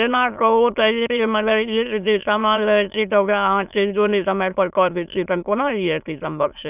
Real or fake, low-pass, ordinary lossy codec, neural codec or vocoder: fake; 3.6 kHz; none; autoencoder, 22.05 kHz, a latent of 192 numbers a frame, VITS, trained on many speakers